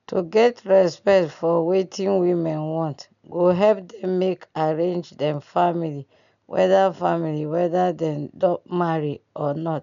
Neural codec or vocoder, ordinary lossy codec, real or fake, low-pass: none; none; real; 7.2 kHz